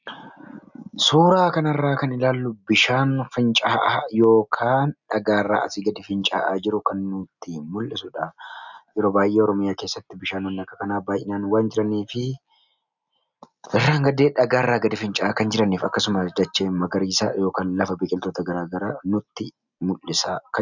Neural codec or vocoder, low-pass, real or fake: none; 7.2 kHz; real